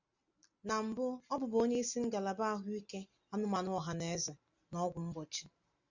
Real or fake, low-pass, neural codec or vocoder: real; 7.2 kHz; none